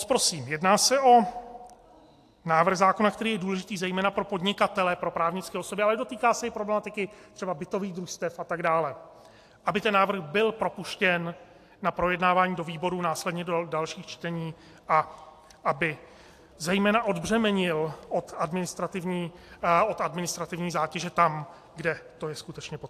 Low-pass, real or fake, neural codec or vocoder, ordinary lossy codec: 14.4 kHz; real; none; AAC, 64 kbps